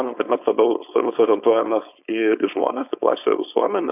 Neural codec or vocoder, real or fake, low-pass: codec, 16 kHz, 4.8 kbps, FACodec; fake; 3.6 kHz